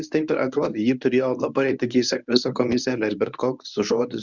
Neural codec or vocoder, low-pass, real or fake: codec, 24 kHz, 0.9 kbps, WavTokenizer, medium speech release version 1; 7.2 kHz; fake